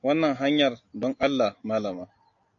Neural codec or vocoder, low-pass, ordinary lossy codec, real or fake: none; 7.2 kHz; AAC, 48 kbps; real